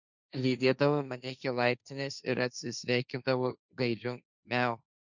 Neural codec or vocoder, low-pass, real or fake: codec, 16 kHz, 1.1 kbps, Voila-Tokenizer; 7.2 kHz; fake